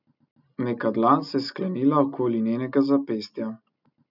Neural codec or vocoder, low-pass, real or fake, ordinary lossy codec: none; 5.4 kHz; real; none